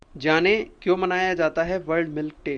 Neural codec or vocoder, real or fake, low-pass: none; real; 9.9 kHz